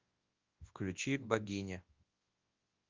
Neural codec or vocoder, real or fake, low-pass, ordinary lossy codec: codec, 24 kHz, 0.9 kbps, WavTokenizer, large speech release; fake; 7.2 kHz; Opus, 32 kbps